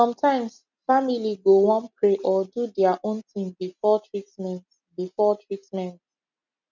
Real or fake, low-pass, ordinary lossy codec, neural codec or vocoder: real; 7.2 kHz; none; none